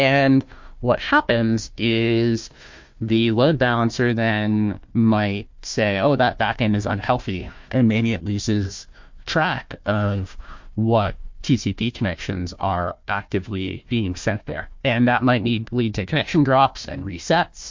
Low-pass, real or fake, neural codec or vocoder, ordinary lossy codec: 7.2 kHz; fake; codec, 16 kHz, 1 kbps, FunCodec, trained on Chinese and English, 50 frames a second; MP3, 48 kbps